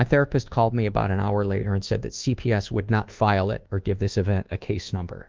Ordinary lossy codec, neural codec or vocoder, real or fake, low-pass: Opus, 32 kbps; codec, 24 kHz, 1.2 kbps, DualCodec; fake; 7.2 kHz